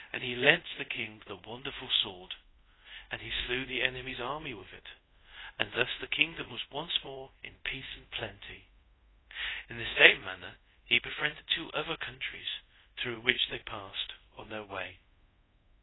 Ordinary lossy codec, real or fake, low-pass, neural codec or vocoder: AAC, 16 kbps; fake; 7.2 kHz; codec, 24 kHz, 0.5 kbps, DualCodec